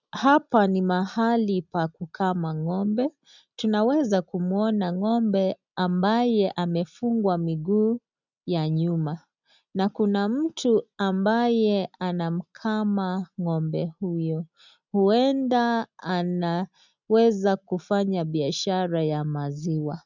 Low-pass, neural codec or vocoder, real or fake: 7.2 kHz; none; real